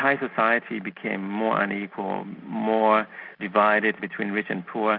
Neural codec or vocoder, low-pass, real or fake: none; 5.4 kHz; real